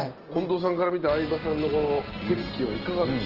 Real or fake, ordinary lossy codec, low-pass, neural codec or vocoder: real; Opus, 16 kbps; 5.4 kHz; none